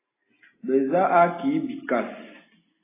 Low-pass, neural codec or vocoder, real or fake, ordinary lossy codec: 3.6 kHz; none; real; AAC, 16 kbps